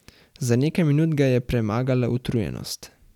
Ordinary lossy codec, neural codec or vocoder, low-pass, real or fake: none; none; 19.8 kHz; real